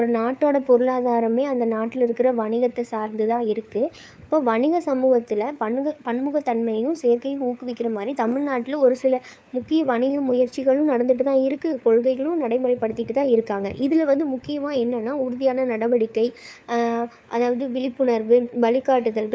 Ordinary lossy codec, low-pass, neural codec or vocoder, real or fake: none; none; codec, 16 kHz, 4 kbps, FunCodec, trained on LibriTTS, 50 frames a second; fake